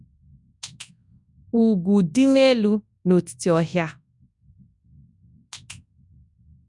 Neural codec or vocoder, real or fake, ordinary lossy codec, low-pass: codec, 24 kHz, 0.9 kbps, WavTokenizer, large speech release; fake; none; 10.8 kHz